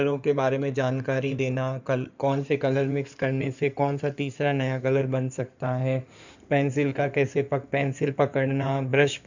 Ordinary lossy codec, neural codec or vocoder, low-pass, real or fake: none; codec, 16 kHz in and 24 kHz out, 2.2 kbps, FireRedTTS-2 codec; 7.2 kHz; fake